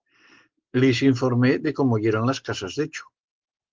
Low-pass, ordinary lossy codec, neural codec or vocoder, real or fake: 7.2 kHz; Opus, 16 kbps; none; real